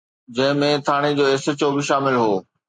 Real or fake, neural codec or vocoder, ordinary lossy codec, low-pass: real; none; MP3, 64 kbps; 9.9 kHz